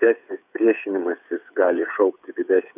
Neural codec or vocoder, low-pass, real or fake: codec, 16 kHz, 8 kbps, FreqCodec, smaller model; 3.6 kHz; fake